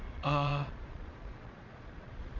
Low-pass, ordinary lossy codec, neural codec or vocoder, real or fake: 7.2 kHz; Opus, 64 kbps; vocoder, 22.05 kHz, 80 mel bands, Vocos; fake